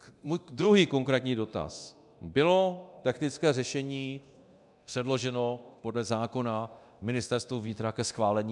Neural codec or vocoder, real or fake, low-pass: codec, 24 kHz, 0.9 kbps, DualCodec; fake; 10.8 kHz